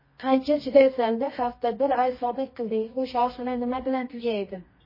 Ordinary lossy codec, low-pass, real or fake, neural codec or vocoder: MP3, 24 kbps; 5.4 kHz; fake; codec, 24 kHz, 0.9 kbps, WavTokenizer, medium music audio release